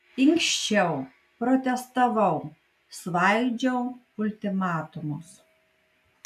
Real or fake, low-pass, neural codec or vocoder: real; 14.4 kHz; none